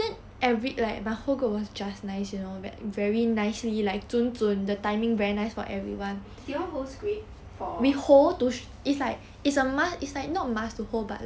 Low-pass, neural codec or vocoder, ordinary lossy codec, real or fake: none; none; none; real